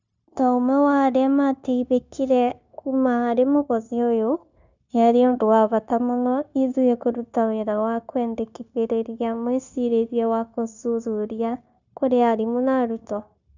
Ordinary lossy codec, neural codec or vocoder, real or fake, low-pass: none; codec, 16 kHz, 0.9 kbps, LongCat-Audio-Codec; fake; 7.2 kHz